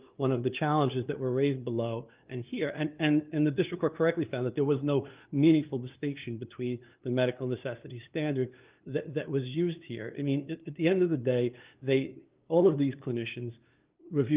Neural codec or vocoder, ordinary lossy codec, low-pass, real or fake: codec, 16 kHz, 2 kbps, FunCodec, trained on LibriTTS, 25 frames a second; Opus, 32 kbps; 3.6 kHz; fake